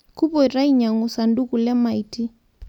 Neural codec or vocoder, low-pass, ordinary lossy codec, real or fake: none; 19.8 kHz; none; real